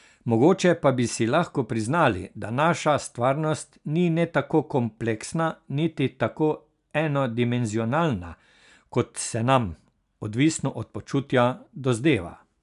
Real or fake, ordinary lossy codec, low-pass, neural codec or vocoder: real; none; 10.8 kHz; none